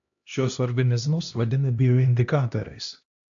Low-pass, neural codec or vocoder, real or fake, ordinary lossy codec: 7.2 kHz; codec, 16 kHz, 1 kbps, X-Codec, HuBERT features, trained on LibriSpeech; fake; AAC, 64 kbps